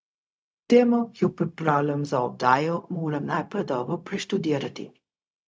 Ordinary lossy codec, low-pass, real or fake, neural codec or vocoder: none; none; fake; codec, 16 kHz, 0.4 kbps, LongCat-Audio-Codec